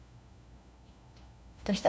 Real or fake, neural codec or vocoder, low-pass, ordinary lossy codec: fake; codec, 16 kHz, 1 kbps, FunCodec, trained on LibriTTS, 50 frames a second; none; none